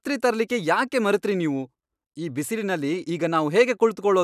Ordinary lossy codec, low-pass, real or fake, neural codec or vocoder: none; 14.4 kHz; real; none